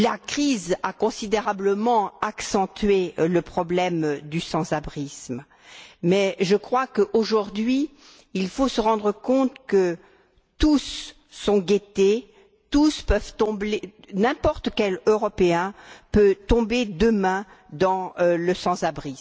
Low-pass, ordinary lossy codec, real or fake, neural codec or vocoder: none; none; real; none